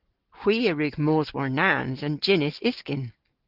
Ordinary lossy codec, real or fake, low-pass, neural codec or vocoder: Opus, 16 kbps; real; 5.4 kHz; none